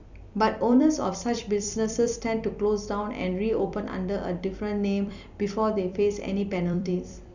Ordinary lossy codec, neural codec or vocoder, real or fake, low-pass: none; vocoder, 44.1 kHz, 128 mel bands every 256 samples, BigVGAN v2; fake; 7.2 kHz